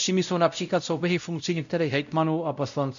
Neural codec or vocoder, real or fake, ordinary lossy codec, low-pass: codec, 16 kHz, 0.5 kbps, X-Codec, WavLM features, trained on Multilingual LibriSpeech; fake; AAC, 96 kbps; 7.2 kHz